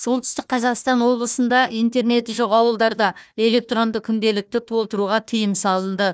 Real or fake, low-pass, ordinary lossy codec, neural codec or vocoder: fake; none; none; codec, 16 kHz, 1 kbps, FunCodec, trained on Chinese and English, 50 frames a second